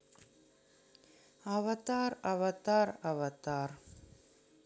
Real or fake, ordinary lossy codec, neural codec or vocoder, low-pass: real; none; none; none